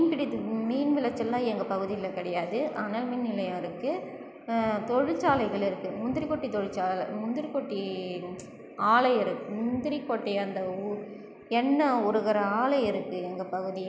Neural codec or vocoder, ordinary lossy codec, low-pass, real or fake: none; none; none; real